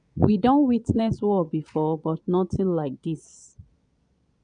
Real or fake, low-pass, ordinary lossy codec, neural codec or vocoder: fake; 9.9 kHz; none; vocoder, 22.05 kHz, 80 mel bands, Vocos